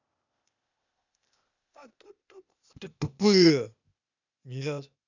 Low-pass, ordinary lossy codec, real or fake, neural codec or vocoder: 7.2 kHz; none; fake; codec, 16 kHz, 0.8 kbps, ZipCodec